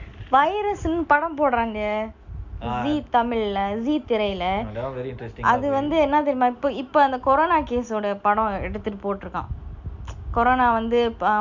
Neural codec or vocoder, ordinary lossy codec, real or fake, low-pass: none; none; real; 7.2 kHz